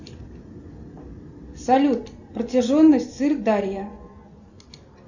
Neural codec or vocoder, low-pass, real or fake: none; 7.2 kHz; real